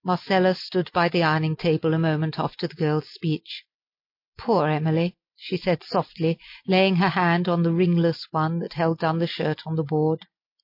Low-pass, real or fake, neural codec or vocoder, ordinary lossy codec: 5.4 kHz; real; none; MP3, 32 kbps